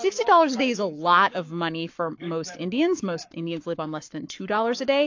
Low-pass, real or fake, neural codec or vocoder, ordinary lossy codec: 7.2 kHz; fake; codec, 44.1 kHz, 7.8 kbps, Pupu-Codec; AAC, 48 kbps